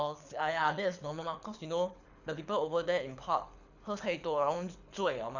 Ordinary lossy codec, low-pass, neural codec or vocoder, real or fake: none; 7.2 kHz; codec, 24 kHz, 6 kbps, HILCodec; fake